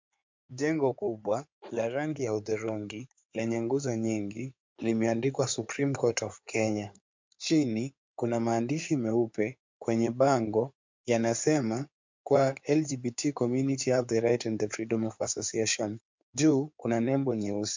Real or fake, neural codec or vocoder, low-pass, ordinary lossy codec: fake; codec, 16 kHz in and 24 kHz out, 2.2 kbps, FireRedTTS-2 codec; 7.2 kHz; MP3, 64 kbps